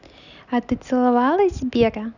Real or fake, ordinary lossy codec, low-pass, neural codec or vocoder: real; none; 7.2 kHz; none